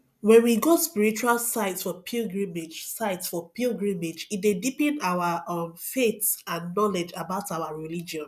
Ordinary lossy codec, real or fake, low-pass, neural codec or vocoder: none; real; 14.4 kHz; none